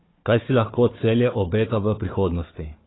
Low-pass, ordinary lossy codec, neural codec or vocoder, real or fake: 7.2 kHz; AAC, 16 kbps; codec, 16 kHz, 4 kbps, FunCodec, trained on Chinese and English, 50 frames a second; fake